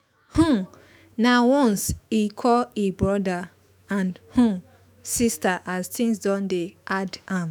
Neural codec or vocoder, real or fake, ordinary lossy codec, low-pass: autoencoder, 48 kHz, 128 numbers a frame, DAC-VAE, trained on Japanese speech; fake; none; none